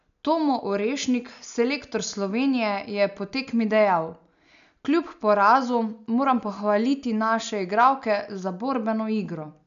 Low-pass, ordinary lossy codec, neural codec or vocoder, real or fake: 7.2 kHz; none; none; real